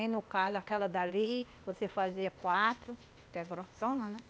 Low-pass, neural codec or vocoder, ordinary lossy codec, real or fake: none; codec, 16 kHz, 0.8 kbps, ZipCodec; none; fake